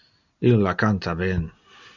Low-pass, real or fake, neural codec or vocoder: 7.2 kHz; real; none